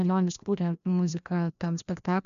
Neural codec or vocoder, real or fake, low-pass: codec, 16 kHz, 1 kbps, FreqCodec, larger model; fake; 7.2 kHz